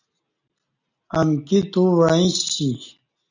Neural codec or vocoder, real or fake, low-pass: none; real; 7.2 kHz